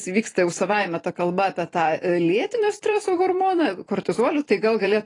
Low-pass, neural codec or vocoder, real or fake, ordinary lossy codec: 10.8 kHz; vocoder, 44.1 kHz, 128 mel bands every 256 samples, BigVGAN v2; fake; AAC, 32 kbps